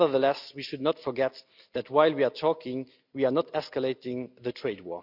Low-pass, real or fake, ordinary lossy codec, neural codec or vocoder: 5.4 kHz; real; none; none